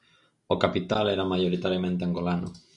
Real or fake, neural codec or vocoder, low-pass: real; none; 10.8 kHz